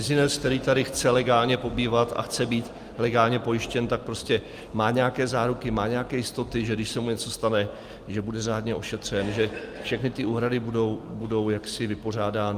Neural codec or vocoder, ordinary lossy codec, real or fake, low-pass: none; Opus, 32 kbps; real; 14.4 kHz